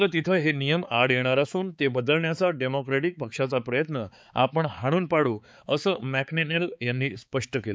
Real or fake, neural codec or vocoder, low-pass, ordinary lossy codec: fake; codec, 16 kHz, 4 kbps, X-Codec, HuBERT features, trained on balanced general audio; none; none